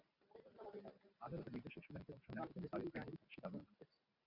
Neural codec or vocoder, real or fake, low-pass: vocoder, 44.1 kHz, 128 mel bands every 512 samples, BigVGAN v2; fake; 5.4 kHz